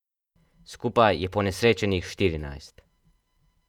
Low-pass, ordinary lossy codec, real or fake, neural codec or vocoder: 19.8 kHz; none; fake; vocoder, 48 kHz, 128 mel bands, Vocos